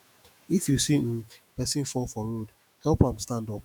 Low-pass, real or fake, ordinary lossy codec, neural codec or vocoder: none; fake; none; autoencoder, 48 kHz, 128 numbers a frame, DAC-VAE, trained on Japanese speech